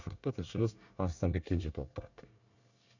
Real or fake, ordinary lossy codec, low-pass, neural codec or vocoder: fake; none; 7.2 kHz; codec, 24 kHz, 1 kbps, SNAC